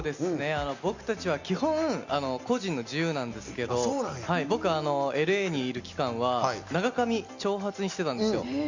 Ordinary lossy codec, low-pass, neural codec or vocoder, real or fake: Opus, 64 kbps; 7.2 kHz; none; real